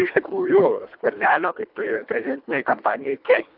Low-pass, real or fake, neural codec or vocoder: 5.4 kHz; fake; codec, 24 kHz, 1.5 kbps, HILCodec